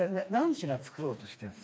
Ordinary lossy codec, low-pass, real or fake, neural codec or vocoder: none; none; fake; codec, 16 kHz, 2 kbps, FreqCodec, smaller model